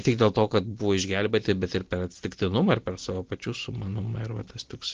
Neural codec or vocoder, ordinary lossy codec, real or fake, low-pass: none; Opus, 16 kbps; real; 7.2 kHz